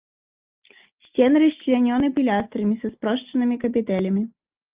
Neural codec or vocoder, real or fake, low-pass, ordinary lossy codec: none; real; 3.6 kHz; Opus, 32 kbps